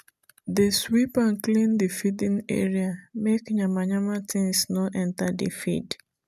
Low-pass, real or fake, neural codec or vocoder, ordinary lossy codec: 14.4 kHz; real; none; none